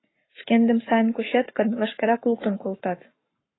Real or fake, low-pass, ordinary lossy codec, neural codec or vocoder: fake; 7.2 kHz; AAC, 16 kbps; codec, 44.1 kHz, 7.8 kbps, Pupu-Codec